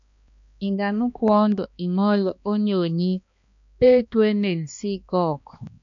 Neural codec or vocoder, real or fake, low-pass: codec, 16 kHz, 2 kbps, X-Codec, HuBERT features, trained on balanced general audio; fake; 7.2 kHz